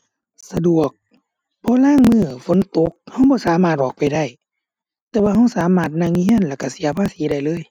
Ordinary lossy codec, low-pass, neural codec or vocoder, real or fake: none; 19.8 kHz; none; real